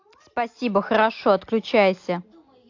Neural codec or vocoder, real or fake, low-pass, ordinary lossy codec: none; real; 7.2 kHz; AAC, 48 kbps